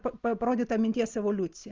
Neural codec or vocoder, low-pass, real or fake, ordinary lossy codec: none; 7.2 kHz; real; Opus, 32 kbps